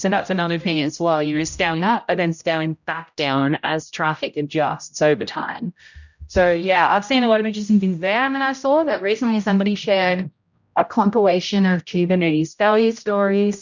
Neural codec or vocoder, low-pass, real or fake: codec, 16 kHz, 0.5 kbps, X-Codec, HuBERT features, trained on general audio; 7.2 kHz; fake